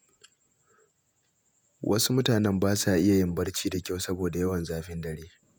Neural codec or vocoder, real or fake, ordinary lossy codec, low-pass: vocoder, 48 kHz, 128 mel bands, Vocos; fake; none; none